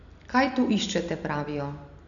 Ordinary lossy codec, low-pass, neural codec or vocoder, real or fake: none; 7.2 kHz; none; real